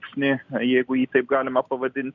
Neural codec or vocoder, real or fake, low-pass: none; real; 7.2 kHz